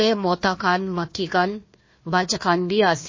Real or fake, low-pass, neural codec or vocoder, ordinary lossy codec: fake; 7.2 kHz; codec, 16 kHz, 1 kbps, FunCodec, trained on Chinese and English, 50 frames a second; MP3, 32 kbps